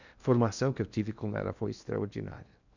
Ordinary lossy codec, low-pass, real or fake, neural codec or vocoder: none; 7.2 kHz; fake; codec, 16 kHz in and 24 kHz out, 0.8 kbps, FocalCodec, streaming, 65536 codes